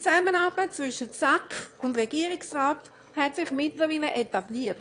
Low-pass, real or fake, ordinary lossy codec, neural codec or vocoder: 9.9 kHz; fake; AAC, 48 kbps; autoencoder, 22.05 kHz, a latent of 192 numbers a frame, VITS, trained on one speaker